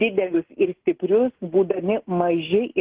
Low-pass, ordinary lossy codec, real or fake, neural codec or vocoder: 3.6 kHz; Opus, 16 kbps; real; none